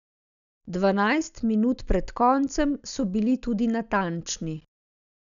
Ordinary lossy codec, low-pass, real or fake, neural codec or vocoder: none; 7.2 kHz; real; none